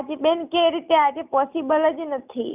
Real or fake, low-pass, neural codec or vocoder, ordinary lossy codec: real; 3.6 kHz; none; none